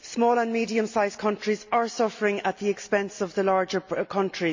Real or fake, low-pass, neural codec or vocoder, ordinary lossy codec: real; 7.2 kHz; none; MP3, 48 kbps